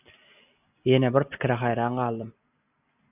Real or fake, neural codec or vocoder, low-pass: real; none; 3.6 kHz